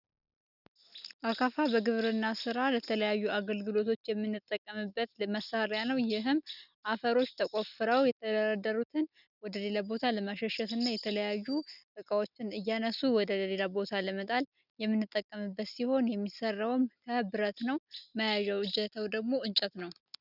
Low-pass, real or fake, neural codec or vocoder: 5.4 kHz; real; none